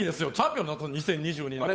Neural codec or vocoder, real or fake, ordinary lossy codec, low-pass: codec, 16 kHz, 8 kbps, FunCodec, trained on Chinese and English, 25 frames a second; fake; none; none